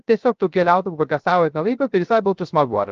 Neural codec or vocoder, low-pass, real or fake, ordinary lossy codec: codec, 16 kHz, 0.3 kbps, FocalCodec; 7.2 kHz; fake; Opus, 32 kbps